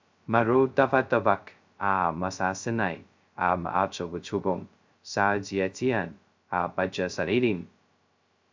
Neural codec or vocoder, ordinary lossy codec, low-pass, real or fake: codec, 16 kHz, 0.2 kbps, FocalCodec; MP3, 64 kbps; 7.2 kHz; fake